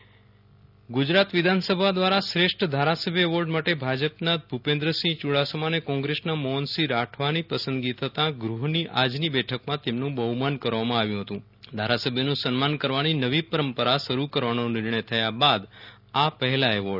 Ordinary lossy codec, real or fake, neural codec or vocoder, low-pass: none; real; none; 5.4 kHz